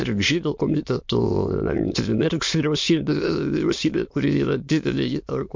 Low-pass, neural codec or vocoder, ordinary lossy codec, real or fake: 7.2 kHz; autoencoder, 22.05 kHz, a latent of 192 numbers a frame, VITS, trained on many speakers; MP3, 48 kbps; fake